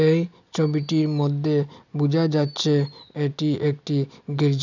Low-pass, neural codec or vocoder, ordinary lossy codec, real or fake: 7.2 kHz; none; none; real